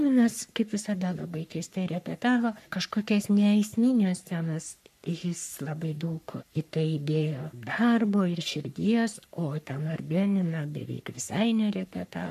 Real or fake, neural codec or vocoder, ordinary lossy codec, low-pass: fake; codec, 44.1 kHz, 3.4 kbps, Pupu-Codec; MP3, 96 kbps; 14.4 kHz